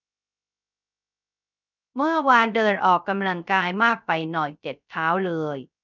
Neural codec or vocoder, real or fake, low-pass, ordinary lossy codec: codec, 16 kHz, 0.3 kbps, FocalCodec; fake; 7.2 kHz; none